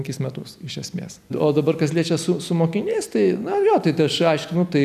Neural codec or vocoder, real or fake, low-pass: none; real; 14.4 kHz